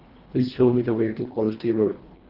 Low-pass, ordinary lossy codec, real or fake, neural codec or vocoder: 5.4 kHz; Opus, 16 kbps; fake; codec, 24 kHz, 1.5 kbps, HILCodec